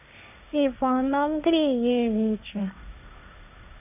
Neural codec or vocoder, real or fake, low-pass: codec, 16 kHz, 1.1 kbps, Voila-Tokenizer; fake; 3.6 kHz